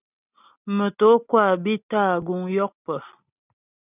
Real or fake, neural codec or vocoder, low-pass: real; none; 3.6 kHz